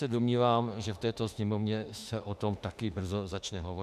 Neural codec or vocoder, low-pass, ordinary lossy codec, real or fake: autoencoder, 48 kHz, 32 numbers a frame, DAC-VAE, trained on Japanese speech; 14.4 kHz; AAC, 96 kbps; fake